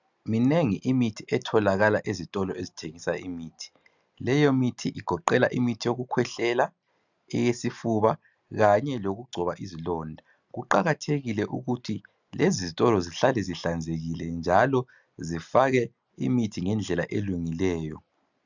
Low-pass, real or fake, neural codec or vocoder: 7.2 kHz; real; none